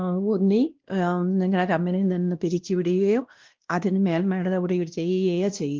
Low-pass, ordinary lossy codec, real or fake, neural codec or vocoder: 7.2 kHz; Opus, 16 kbps; fake; codec, 16 kHz, 1 kbps, X-Codec, WavLM features, trained on Multilingual LibriSpeech